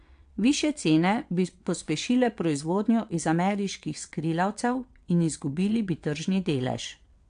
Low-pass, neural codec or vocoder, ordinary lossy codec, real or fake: 9.9 kHz; vocoder, 22.05 kHz, 80 mel bands, WaveNeXt; AAC, 64 kbps; fake